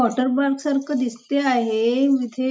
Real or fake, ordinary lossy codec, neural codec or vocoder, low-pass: real; none; none; none